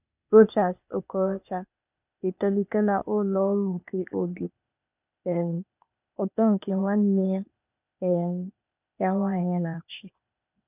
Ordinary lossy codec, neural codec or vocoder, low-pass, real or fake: none; codec, 16 kHz, 0.8 kbps, ZipCodec; 3.6 kHz; fake